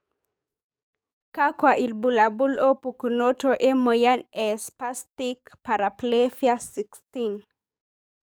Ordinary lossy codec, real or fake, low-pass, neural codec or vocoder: none; fake; none; codec, 44.1 kHz, 7.8 kbps, DAC